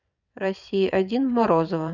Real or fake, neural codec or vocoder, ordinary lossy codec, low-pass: fake; vocoder, 22.05 kHz, 80 mel bands, WaveNeXt; none; 7.2 kHz